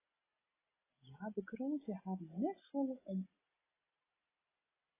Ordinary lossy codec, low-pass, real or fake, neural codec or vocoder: AAC, 16 kbps; 3.6 kHz; real; none